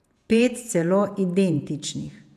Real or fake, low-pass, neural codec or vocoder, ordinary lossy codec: fake; 14.4 kHz; vocoder, 48 kHz, 128 mel bands, Vocos; none